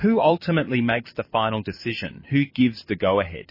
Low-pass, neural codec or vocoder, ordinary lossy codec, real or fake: 5.4 kHz; vocoder, 44.1 kHz, 128 mel bands every 256 samples, BigVGAN v2; MP3, 24 kbps; fake